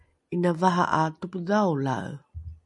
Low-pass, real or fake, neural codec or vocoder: 10.8 kHz; real; none